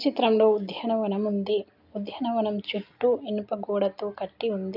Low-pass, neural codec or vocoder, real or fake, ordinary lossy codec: 5.4 kHz; none; real; none